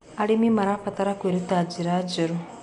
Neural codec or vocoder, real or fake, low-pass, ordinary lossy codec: none; real; 10.8 kHz; none